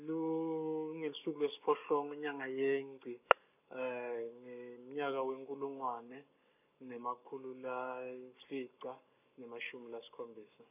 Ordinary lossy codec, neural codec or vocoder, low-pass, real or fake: none; codec, 44.1 kHz, 7.8 kbps, Pupu-Codec; 3.6 kHz; fake